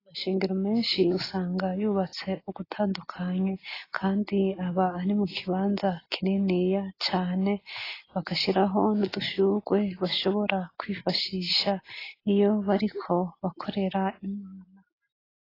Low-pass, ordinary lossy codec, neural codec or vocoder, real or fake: 5.4 kHz; AAC, 24 kbps; none; real